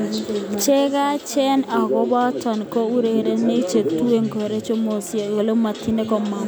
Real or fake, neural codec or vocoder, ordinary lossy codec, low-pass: real; none; none; none